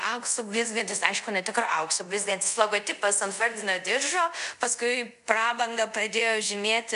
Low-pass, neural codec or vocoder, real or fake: 10.8 kHz; codec, 24 kHz, 0.5 kbps, DualCodec; fake